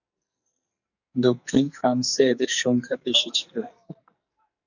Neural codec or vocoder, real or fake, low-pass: codec, 44.1 kHz, 2.6 kbps, SNAC; fake; 7.2 kHz